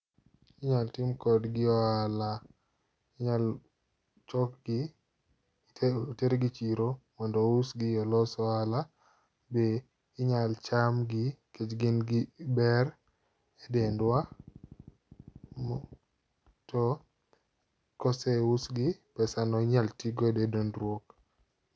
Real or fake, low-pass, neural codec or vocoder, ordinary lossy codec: real; none; none; none